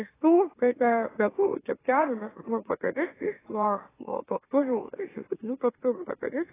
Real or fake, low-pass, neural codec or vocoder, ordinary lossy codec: fake; 3.6 kHz; autoencoder, 44.1 kHz, a latent of 192 numbers a frame, MeloTTS; AAC, 16 kbps